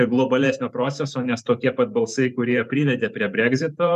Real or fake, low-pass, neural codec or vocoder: fake; 14.4 kHz; vocoder, 44.1 kHz, 128 mel bands every 512 samples, BigVGAN v2